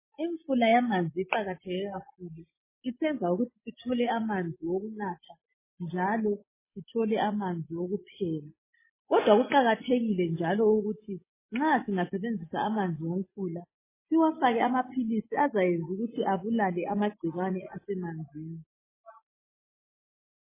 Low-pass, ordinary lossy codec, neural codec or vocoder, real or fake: 3.6 kHz; MP3, 16 kbps; none; real